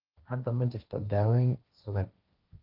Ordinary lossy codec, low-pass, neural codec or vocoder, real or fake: Opus, 32 kbps; 5.4 kHz; codec, 16 kHz, 1.1 kbps, Voila-Tokenizer; fake